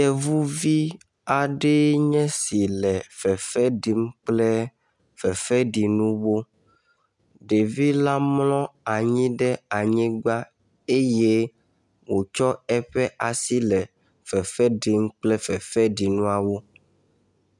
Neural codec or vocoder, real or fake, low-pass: none; real; 10.8 kHz